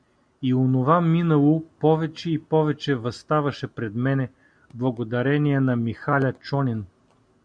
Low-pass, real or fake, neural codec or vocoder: 9.9 kHz; real; none